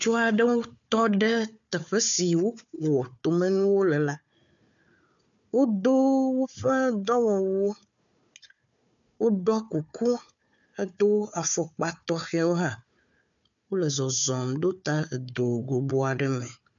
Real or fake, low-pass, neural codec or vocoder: fake; 7.2 kHz; codec, 16 kHz, 4 kbps, FunCodec, trained on Chinese and English, 50 frames a second